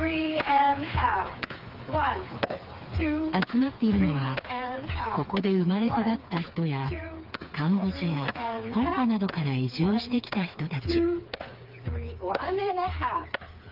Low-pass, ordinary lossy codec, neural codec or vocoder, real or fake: 5.4 kHz; Opus, 32 kbps; codec, 16 kHz, 4 kbps, FreqCodec, smaller model; fake